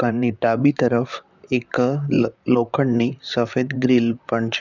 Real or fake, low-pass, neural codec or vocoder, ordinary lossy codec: fake; 7.2 kHz; vocoder, 44.1 kHz, 128 mel bands, Pupu-Vocoder; none